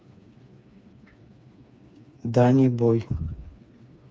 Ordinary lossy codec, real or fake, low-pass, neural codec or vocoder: none; fake; none; codec, 16 kHz, 4 kbps, FreqCodec, smaller model